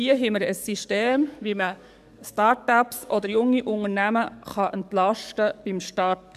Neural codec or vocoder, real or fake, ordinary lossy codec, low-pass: codec, 44.1 kHz, 7.8 kbps, DAC; fake; none; 14.4 kHz